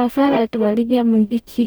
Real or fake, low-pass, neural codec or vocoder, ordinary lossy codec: fake; none; codec, 44.1 kHz, 0.9 kbps, DAC; none